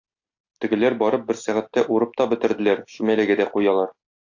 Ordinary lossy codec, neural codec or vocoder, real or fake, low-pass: AAC, 48 kbps; none; real; 7.2 kHz